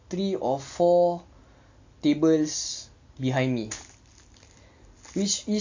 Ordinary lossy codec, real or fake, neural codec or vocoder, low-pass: AAC, 48 kbps; real; none; 7.2 kHz